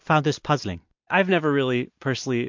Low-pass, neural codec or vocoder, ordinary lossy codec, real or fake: 7.2 kHz; none; MP3, 48 kbps; real